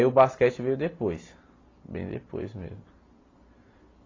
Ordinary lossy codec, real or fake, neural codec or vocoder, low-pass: AAC, 32 kbps; real; none; 7.2 kHz